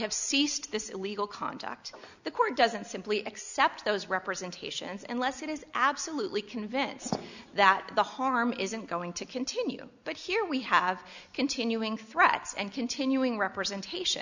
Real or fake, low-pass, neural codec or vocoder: real; 7.2 kHz; none